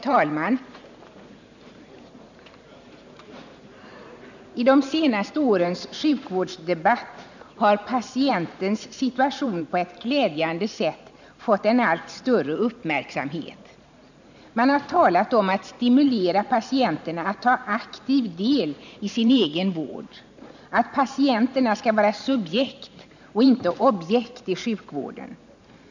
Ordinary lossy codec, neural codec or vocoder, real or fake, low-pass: none; vocoder, 44.1 kHz, 128 mel bands every 512 samples, BigVGAN v2; fake; 7.2 kHz